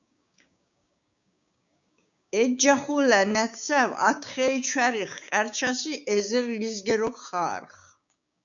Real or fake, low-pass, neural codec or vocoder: fake; 7.2 kHz; codec, 16 kHz, 6 kbps, DAC